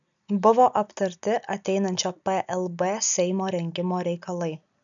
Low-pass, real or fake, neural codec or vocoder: 7.2 kHz; real; none